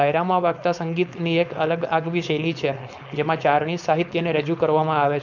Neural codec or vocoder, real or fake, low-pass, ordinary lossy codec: codec, 16 kHz, 4.8 kbps, FACodec; fake; 7.2 kHz; none